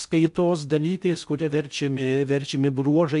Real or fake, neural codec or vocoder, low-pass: fake; codec, 16 kHz in and 24 kHz out, 0.6 kbps, FocalCodec, streaming, 4096 codes; 10.8 kHz